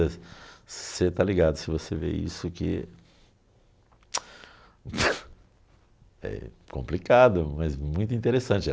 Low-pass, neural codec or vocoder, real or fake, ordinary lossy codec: none; none; real; none